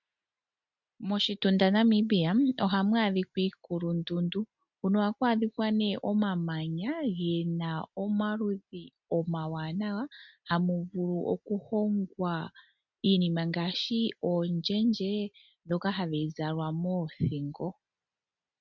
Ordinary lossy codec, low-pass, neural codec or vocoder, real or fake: MP3, 64 kbps; 7.2 kHz; none; real